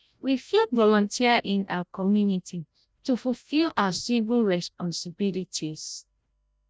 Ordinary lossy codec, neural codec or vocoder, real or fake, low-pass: none; codec, 16 kHz, 0.5 kbps, FreqCodec, larger model; fake; none